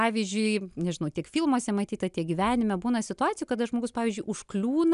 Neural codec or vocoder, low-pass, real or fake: none; 10.8 kHz; real